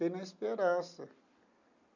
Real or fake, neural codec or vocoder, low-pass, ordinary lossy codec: real; none; 7.2 kHz; none